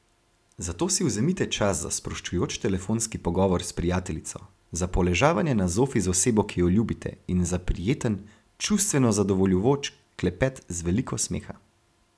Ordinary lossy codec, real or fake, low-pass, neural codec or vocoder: none; real; none; none